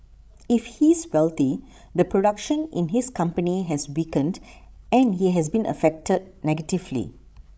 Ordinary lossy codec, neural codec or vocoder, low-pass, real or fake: none; codec, 16 kHz, 16 kbps, FreqCodec, larger model; none; fake